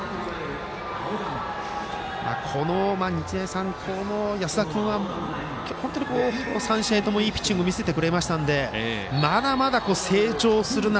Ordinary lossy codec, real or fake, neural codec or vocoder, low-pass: none; real; none; none